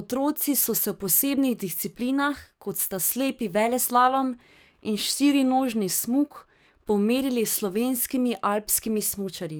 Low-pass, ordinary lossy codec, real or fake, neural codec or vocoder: none; none; fake; codec, 44.1 kHz, 7.8 kbps, DAC